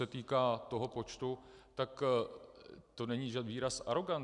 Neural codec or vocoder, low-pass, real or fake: none; 10.8 kHz; real